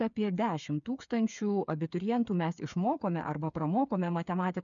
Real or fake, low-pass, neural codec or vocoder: fake; 7.2 kHz; codec, 16 kHz, 8 kbps, FreqCodec, smaller model